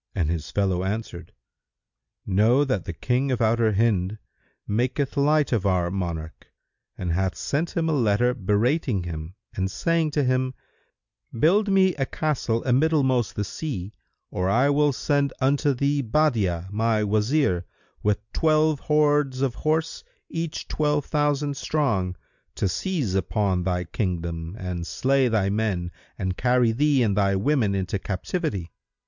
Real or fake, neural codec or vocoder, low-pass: real; none; 7.2 kHz